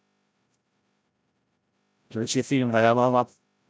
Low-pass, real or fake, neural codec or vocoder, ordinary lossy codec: none; fake; codec, 16 kHz, 0.5 kbps, FreqCodec, larger model; none